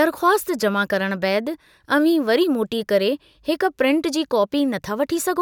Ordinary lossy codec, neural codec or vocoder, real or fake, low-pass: none; none; real; 19.8 kHz